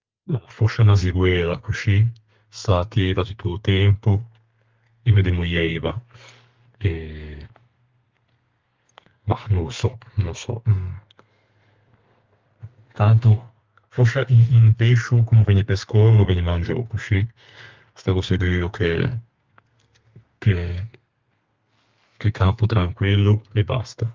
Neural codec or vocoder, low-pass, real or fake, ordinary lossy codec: codec, 32 kHz, 1.9 kbps, SNAC; 7.2 kHz; fake; Opus, 32 kbps